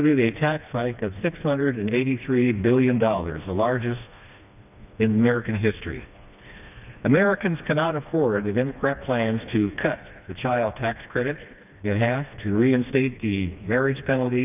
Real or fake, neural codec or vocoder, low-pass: fake; codec, 16 kHz, 2 kbps, FreqCodec, smaller model; 3.6 kHz